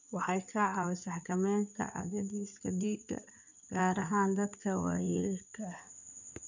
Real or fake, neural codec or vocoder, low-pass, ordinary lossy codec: fake; codec, 16 kHz in and 24 kHz out, 2.2 kbps, FireRedTTS-2 codec; 7.2 kHz; none